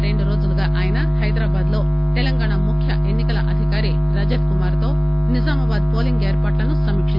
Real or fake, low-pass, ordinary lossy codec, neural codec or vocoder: real; 5.4 kHz; none; none